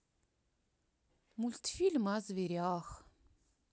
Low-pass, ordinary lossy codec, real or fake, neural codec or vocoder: none; none; real; none